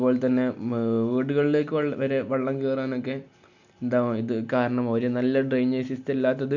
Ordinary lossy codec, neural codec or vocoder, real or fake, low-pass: none; none; real; 7.2 kHz